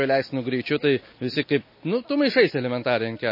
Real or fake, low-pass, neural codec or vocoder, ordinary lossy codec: real; 5.4 kHz; none; MP3, 24 kbps